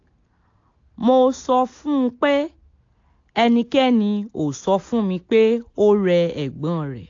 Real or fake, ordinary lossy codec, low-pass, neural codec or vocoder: real; AAC, 48 kbps; 7.2 kHz; none